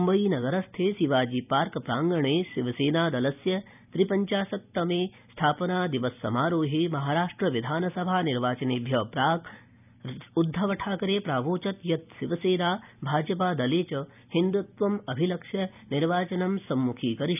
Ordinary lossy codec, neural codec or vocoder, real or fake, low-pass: none; none; real; 3.6 kHz